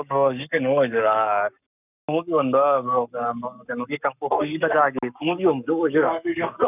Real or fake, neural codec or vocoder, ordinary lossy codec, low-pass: fake; codec, 44.1 kHz, 7.8 kbps, Pupu-Codec; none; 3.6 kHz